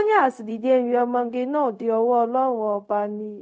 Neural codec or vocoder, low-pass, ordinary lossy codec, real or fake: codec, 16 kHz, 0.4 kbps, LongCat-Audio-Codec; none; none; fake